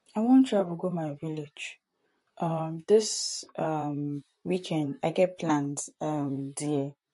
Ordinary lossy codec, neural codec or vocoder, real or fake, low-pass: MP3, 48 kbps; vocoder, 44.1 kHz, 128 mel bands, Pupu-Vocoder; fake; 14.4 kHz